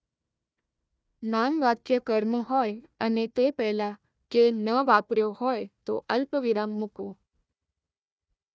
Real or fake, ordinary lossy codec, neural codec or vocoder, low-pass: fake; none; codec, 16 kHz, 1 kbps, FunCodec, trained on Chinese and English, 50 frames a second; none